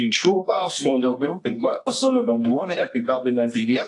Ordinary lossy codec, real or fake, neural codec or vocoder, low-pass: AAC, 48 kbps; fake; codec, 24 kHz, 0.9 kbps, WavTokenizer, medium music audio release; 10.8 kHz